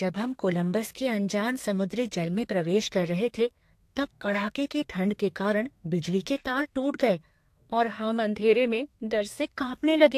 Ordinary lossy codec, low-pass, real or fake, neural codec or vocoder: AAC, 64 kbps; 14.4 kHz; fake; codec, 32 kHz, 1.9 kbps, SNAC